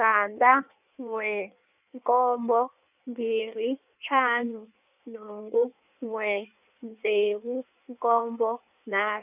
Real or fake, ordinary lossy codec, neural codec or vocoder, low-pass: fake; none; codec, 16 kHz in and 24 kHz out, 1.1 kbps, FireRedTTS-2 codec; 3.6 kHz